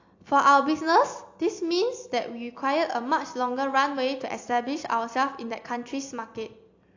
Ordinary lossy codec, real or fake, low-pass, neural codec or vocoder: AAC, 48 kbps; real; 7.2 kHz; none